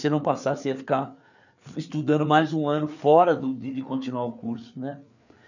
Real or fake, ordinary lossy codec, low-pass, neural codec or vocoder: fake; none; 7.2 kHz; codec, 16 kHz, 4 kbps, FreqCodec, larger model